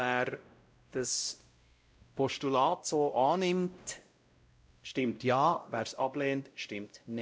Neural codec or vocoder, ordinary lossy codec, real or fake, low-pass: codec, 16 kHz, 0.5 kbps, X-Codec, WavLM features, trained on Multilingual LibriSpeech; none; fake; none